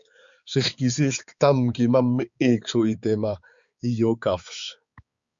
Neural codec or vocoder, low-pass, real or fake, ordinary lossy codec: codec, 16 kHz, 4 kbps, X-Codec, HuBERT features, trained on balanced general audio; 7.2 kHz; fake; Opus, 64 kbps